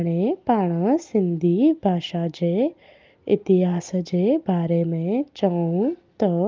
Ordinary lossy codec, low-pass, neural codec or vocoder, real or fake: Opus, 24 kbps; 7.2 kHz; none; real